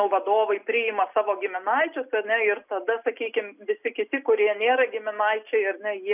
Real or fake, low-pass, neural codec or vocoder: real; 3.6 kHz; none